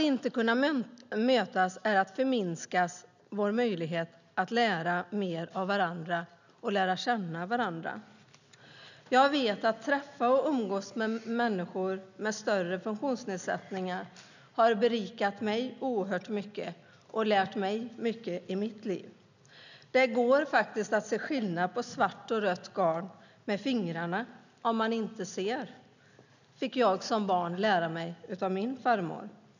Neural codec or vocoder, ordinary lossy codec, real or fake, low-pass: none; none; real; 7.2 kHz